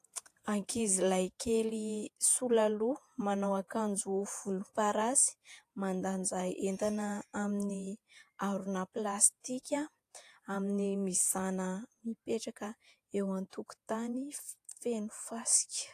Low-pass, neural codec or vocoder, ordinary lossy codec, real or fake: 14.4 kHz; vocoder, 48 kHz, 128 mel bands, Vocos; AAC, 64 kbps; fake